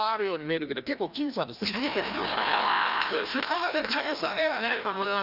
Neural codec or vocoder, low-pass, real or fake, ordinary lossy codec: codec, 16 kHz, 1 kbps, FreqCodec, larger model; 5.4 kHz; fake; none